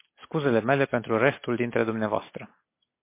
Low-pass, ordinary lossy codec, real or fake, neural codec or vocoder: 3.6 kHz; MP3, 24 kbps; real; none